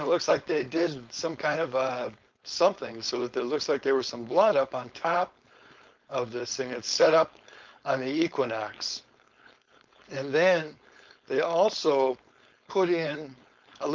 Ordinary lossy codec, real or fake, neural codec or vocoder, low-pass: Opus, 32 kbps; fake; codec, 16 kHz, 4.8 kbps, FACodec; 7.2 kHz